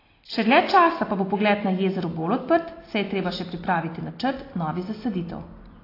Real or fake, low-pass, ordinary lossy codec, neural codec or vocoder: real; 5.4 kHz; AAC, 24 kbps; none